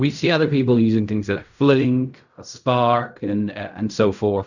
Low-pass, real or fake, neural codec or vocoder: 7.2 kHz; fake; codec, 16 kHz in and 24 kHz out, 0.4 kbps, LongCat-Audio-Codec, fine tuned four codebook decoder